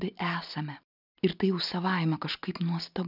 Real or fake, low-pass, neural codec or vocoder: real; 5.4 kHz; none